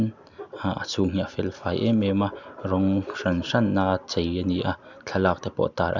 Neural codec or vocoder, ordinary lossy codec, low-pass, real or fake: none; none; 7.2 kHz; real